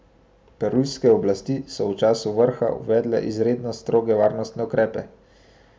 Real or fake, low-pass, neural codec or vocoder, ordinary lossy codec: real; none; none; none